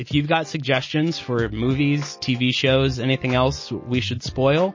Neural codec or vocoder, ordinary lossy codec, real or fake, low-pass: none; MP3, 32 kbps; real; 7.2 kHz